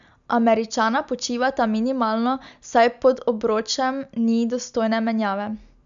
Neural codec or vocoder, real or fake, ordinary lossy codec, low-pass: none; real; none; 7.2 kHz